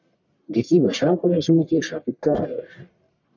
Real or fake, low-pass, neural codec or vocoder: fake; 7.2 kHz; codec, 44.1 kHz, 1.7 kbps, Pupu-Codec